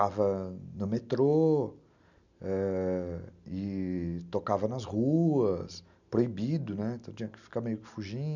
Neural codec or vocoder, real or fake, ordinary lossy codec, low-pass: none; real; none; 7.2 kHz